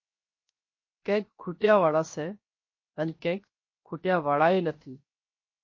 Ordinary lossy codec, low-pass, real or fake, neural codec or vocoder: MP3, 32 kbps; 7.2 kHz; fake; codec, 16 kHz, 0.7 kbps, FocalCodec